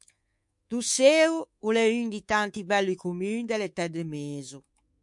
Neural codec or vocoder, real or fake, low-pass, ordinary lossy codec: codec, 24 kHz, 3.1 kbps, DualCodec; fake; 10.8 kHz; MP3, 64 kbps